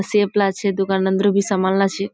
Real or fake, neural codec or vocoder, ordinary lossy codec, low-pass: real; none; none; none